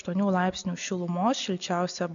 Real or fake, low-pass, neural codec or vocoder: real; 7.2 kHz; none